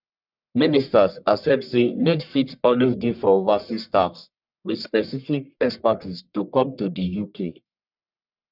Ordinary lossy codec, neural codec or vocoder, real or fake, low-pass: none; codec, 44.1 kHz, 1.7 kbps, Pupu-Codec; fake; 5.4 kHz